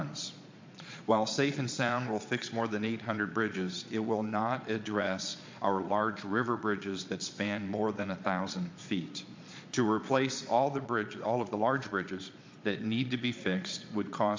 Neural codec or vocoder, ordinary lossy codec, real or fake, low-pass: vocoder, 22.05 kHz, 80 mel bands, Vocos; MP3, 48 kbps; fake; 7.2 kHz